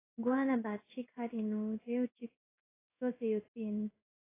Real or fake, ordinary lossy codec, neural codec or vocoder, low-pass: fake; AAC, 16 kbps; codec, 16 kHz in and 24 kHz out, 1 kbps, XY-Tokenizer; 3.6 kHz